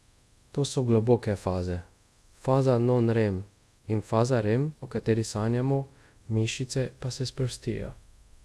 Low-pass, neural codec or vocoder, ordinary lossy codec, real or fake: none; codec, 24 kHz, 0.5 kbps, DualCodec; none; fake